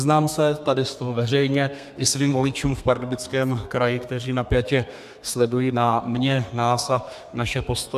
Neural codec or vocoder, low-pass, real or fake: codec, 32 kHz, 1.9 kbps, SNAC; 14.4 kHz; fake